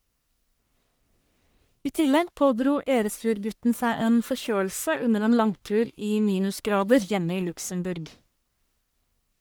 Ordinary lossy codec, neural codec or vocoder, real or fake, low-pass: none; codec, 44.1 kHz, 1.7 kbps, Pupu-Codec; fake; none